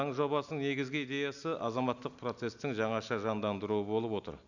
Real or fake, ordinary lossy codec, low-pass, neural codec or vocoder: real; none; 7.2 kHz; none